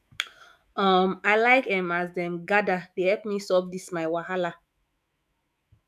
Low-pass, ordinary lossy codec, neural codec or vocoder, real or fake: 14.4 kHz; none; autoencoder, 48 kHz, 128 numbers a frame, DAC-VAE, trained on Japanese speech; fake